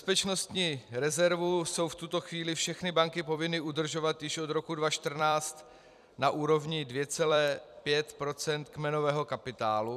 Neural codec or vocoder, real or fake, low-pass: vocoder, 44.1 kHz, 128 mel bands every 256 samples, BigVGAN v2; fake; 14.4 kHz